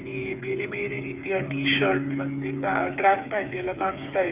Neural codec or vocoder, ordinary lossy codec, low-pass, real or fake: codec, 16 kHz, 8 kbps, FreqCodec, smaller model; Opus, 64 kbps; 3.6 kHz; fake